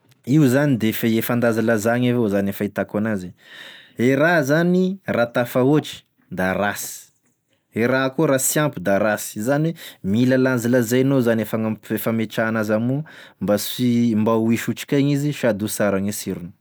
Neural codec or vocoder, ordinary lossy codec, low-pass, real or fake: none; none; none; real